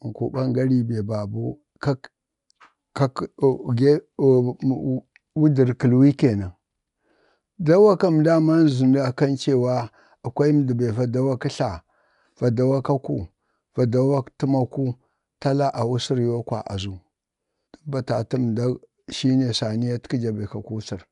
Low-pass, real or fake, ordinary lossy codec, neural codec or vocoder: 10.8 kHz; real; none; none